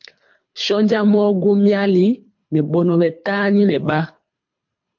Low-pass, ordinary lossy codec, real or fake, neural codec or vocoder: 7.2 kHz; MP3, 48 kbps; fake; codec, 24 kHz, 3 kbps, HILCodec